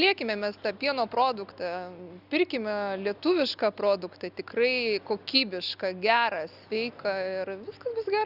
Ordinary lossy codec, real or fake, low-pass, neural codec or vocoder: Opus, 64 kbps; real; 5.4 kHz; none